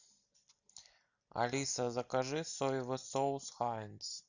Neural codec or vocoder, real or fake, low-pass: none; real; 7.2 kHz